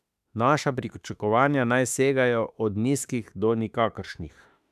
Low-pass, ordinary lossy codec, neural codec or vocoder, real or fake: 14.4 kHz; none; autoencoder, 48 kHz, 32 numbers a frame, DAC-VAE, trained on Japanese speech; fake